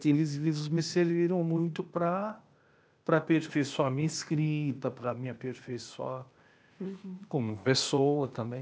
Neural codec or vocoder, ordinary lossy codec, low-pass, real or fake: codec, 16 kHz, 0.8 kbps, ZipCodec; none; none; fake